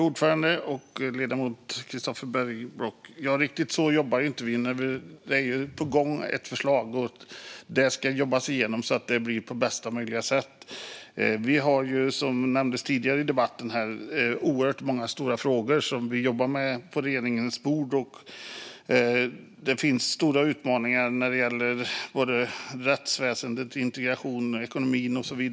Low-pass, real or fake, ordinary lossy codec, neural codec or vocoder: none; real; none; none